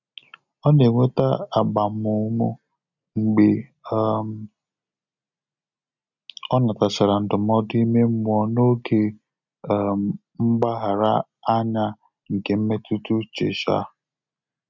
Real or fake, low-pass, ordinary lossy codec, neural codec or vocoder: real; 7.2 kHz; none; none